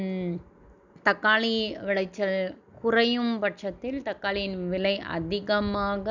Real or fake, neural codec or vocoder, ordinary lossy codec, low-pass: real; none; none; 7.2 kHz